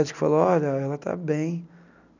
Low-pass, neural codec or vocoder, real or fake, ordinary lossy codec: 7.2 kHz; none; real; none